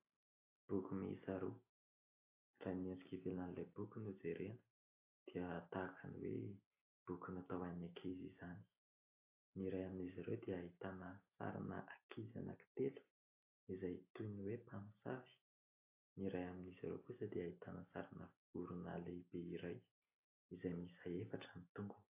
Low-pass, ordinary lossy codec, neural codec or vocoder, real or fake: 3.6 kHz; AAC, 32 kbps; none; real